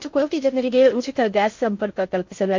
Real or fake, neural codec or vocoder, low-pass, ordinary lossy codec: fake; codec, 16 kHz in and 24 kHz out, 0.6 kbps, FocalCodec, streaming, 2048 codes; 7.2 kHz; MP3, 48 kbps